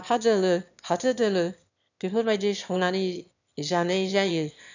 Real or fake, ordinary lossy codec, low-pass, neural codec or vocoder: fake; none; 7.2 kHz; autoencoder, 22.05 kHz, a latent of 192 numbers a frame, VITS, trained on one speaker